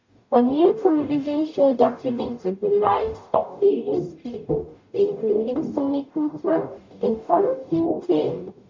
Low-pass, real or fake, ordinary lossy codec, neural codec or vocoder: 7.2 kHz; fake; MP3, 48 kbps; codec, 44.1 kHz, 0.9 kbps, DAC